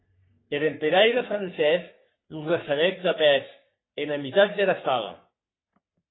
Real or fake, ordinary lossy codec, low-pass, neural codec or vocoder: fake; AAC, 16 kbps; 7.2 kHz; codec, 44.1 kHz, 3.4 kbps, Pupu-Codec